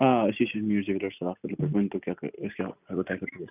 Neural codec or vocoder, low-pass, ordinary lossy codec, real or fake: none; 3.6 kHz; none; real